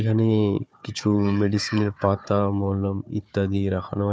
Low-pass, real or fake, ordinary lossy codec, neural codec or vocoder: none; fake; none; codec, 16 kHz, 4 kbps, FunCodec, trained on Chinese and English, 50 frames a second